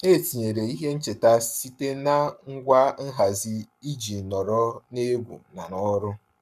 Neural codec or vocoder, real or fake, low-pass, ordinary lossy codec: codec, 44.1 kHz, 7.8 kbps, Pupu-Codec; fake; 14.4 kHz; none